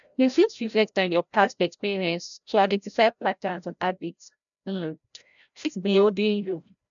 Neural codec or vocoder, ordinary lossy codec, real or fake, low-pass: codec, 16 kHz, 0.5 kbps, FreqCodec, larger model; none; fake; 7.2 kHz